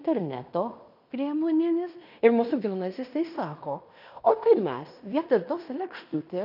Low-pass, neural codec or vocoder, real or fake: 5.4 kHz; codec, 16 kHz in and 24 kHz out, 0.9 kbps, LongCat-Audio-Codec, fine tuned four codebook decoder; fake